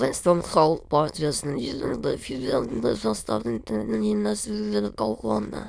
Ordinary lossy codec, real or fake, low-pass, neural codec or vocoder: none; fake; none; autoencoder, 22.05 kHz, a latent of 192 numbers a frame, VITS, trained on many speakers